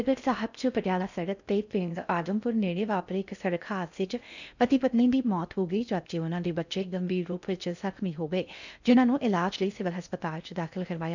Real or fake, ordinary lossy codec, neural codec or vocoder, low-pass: fake; none; codec, 16 kHz in and 24 kHz out, 0.6 kbps, FocalCodec, streaming, 4096 codes; 7.2 kHz